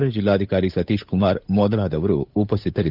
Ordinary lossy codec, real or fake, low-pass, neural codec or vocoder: none; fake; 5.4 kHz; codec, 16 kHz, 8 kbps, FunCodec, trained on Chinese and English, 25 frames a second